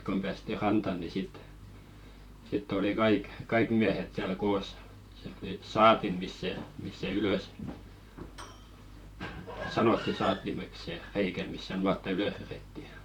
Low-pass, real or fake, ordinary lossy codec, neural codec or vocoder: 19.8 kHz; fake; none; vocoder, 44.1 kHz, 128 mel bands, Pupu-Vocoder